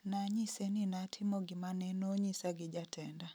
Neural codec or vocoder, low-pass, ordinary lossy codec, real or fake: none; none; none; real